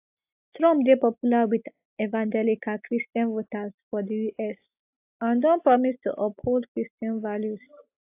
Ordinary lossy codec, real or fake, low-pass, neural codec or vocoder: AAC, 32 kbps; real; 3.6 kHz; none